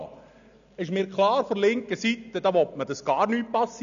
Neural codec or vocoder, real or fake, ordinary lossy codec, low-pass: none; real; none; 7.2 kHz